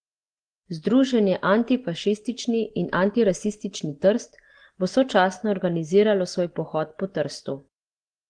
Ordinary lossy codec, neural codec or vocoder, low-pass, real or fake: Opus, 16 kbps; none; 9.9 kHz; real